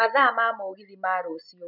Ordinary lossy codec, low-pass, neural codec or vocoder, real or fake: none; 5.4 kHz; none; real